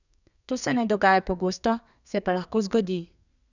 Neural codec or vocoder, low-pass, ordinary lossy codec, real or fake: codec, 32 kHz, 1.9 kbps, SNAC; 7.2 kHz; none; fake